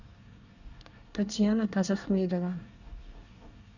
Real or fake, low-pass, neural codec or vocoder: fake; 7.2 kHz; codec, 24 kHz, 1 kbps, SNAC